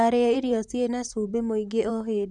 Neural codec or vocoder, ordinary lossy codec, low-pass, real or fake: vocoder, 44.1 kHz, 128 mel bands, Pupu-Vocoder; none; 10.8 kHz; fake